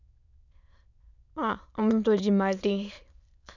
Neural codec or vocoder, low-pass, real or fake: autoencoder, 22.05 kHz, a latent of 192 numbers a frame, VITS, trained on many speakers; 7.2 kHz; fake